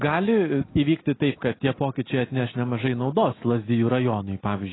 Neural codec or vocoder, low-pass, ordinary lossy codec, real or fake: none; 7.2 kHz; AAC, 16 kbps; real